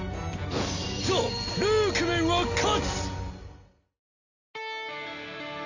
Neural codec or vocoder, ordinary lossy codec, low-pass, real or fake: none; none; 7.2 kHz; real